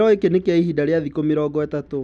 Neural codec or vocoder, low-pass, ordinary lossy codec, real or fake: none; none; none; real